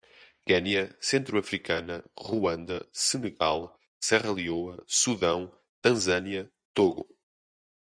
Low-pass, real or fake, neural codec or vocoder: 9.9 kHz; fake; vocoder, 24 kHz, 100 mel bands, Vocos